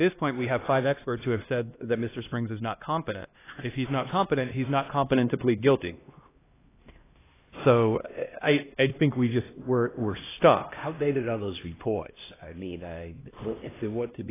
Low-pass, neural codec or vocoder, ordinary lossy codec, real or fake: 3.6 kHz; codec, 16 kHz, 1 kbps, X-Codec, HuBERT features, trained on LibriSpeech; AAC, 16 kbps; fake